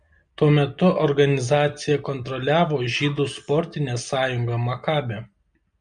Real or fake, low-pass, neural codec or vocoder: real; 9.9 kHz; none